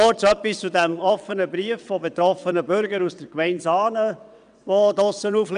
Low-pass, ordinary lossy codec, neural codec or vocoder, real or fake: 9.9 kHz; none; vocoder, 22.05 kHz, 80 mel bands, WaveNeXt; fake